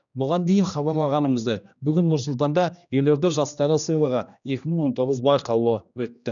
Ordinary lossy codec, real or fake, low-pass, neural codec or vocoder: none; fake; 7.2 kHz; codec, 16 kHz, 1 kbps, X-Codec, HuBERT features, trained on general audio